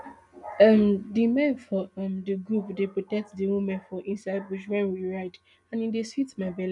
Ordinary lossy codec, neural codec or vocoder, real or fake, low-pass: none; none; real; 10.8 kHz